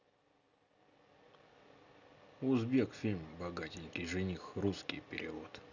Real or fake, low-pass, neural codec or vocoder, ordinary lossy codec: real; 7.2 kHz; none; none